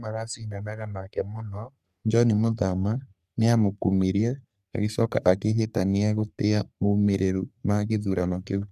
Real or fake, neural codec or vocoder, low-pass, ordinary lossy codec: fake; codec, 44.1 kHz, 3.4 kbps, Pupu-Codec; 14.4 kHz; none